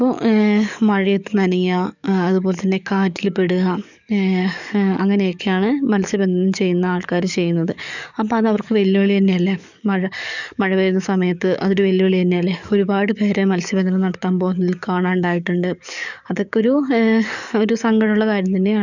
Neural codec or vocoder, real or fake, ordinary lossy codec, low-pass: codec, 44.1 kHz, 7.8 kbps, DAC; fake; none; 7.2 kHz